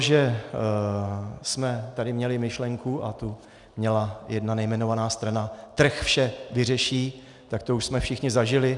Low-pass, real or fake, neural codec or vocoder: 10.8 kHz; real; none